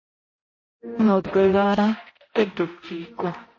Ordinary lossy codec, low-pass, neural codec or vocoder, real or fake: MP3, 32 kbps; 7.2 kHz; codec, 16 kHz, 0.5 kbps, X-Codec, HuBERT features, trained on balanced general audio; fake